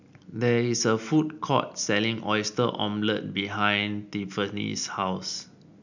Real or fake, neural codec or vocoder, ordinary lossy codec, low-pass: real; none; none; 7.2 kHz